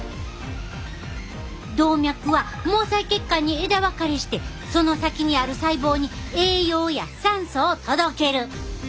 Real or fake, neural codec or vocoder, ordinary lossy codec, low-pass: real; none; none; none